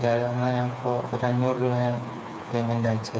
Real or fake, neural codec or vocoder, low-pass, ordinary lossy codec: fake; codec, 16 kHz, 4 kbps, FreqCodec, smaller model; none; none